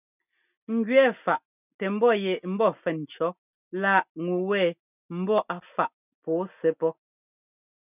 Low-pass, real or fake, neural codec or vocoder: 3.6 kHz; real; none